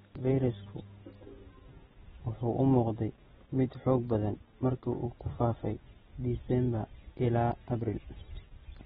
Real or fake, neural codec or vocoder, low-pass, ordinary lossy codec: real; none; 19.8 kHz; AAC, 16 kbps